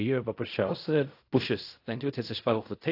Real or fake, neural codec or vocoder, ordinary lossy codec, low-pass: fake; codec, 16 kHz in and 24 kHz out, 0.4 kbps, LongCat-Audio-Codec, fine tuned four codebook decoder; AAC, 32 kbps; 5.4 kHz